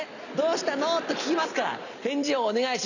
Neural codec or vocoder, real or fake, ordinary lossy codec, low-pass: none; real; none; 7.2 kHz